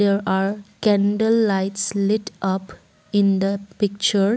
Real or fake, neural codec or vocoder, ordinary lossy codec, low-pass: real; none; none; none